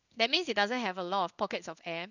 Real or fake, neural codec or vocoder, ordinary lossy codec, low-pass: fake; codec, 16 kHz in and 24 kHz out, 1 kbps, XY-Tokenizer; none; 7.2 kHz